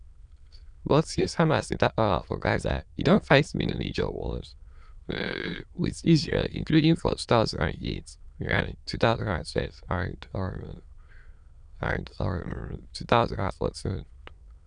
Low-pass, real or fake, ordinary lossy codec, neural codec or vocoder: 9.9 kHz; fake; none; autoencoder, 22.05 kHz, a latent of 192 numbers a frame, VITS, trained on many speakers